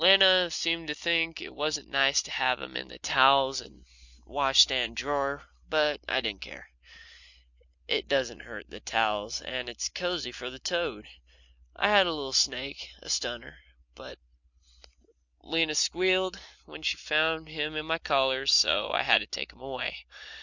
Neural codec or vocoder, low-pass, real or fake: none; 7.2 kHz; real